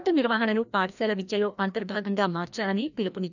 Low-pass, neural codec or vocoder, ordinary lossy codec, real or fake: 7.2 kHz; codec, 16 kHz, 1 kbps, FreqCodec, larger model; none; fake